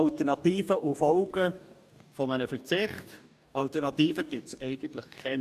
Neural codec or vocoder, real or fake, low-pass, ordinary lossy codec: codec, 44.1 kHz, 2.6 kbps, DAC; fake; 14.4 kHz; none